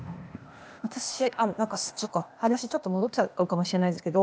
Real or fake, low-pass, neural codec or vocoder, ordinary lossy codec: fake; none; codec, 16 kHz, 0.8 kbps, ZipCodec; none